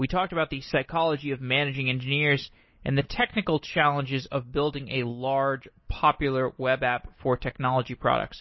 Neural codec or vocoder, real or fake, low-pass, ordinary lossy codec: none; real; 7.2 kHz; MP3, 24 kbps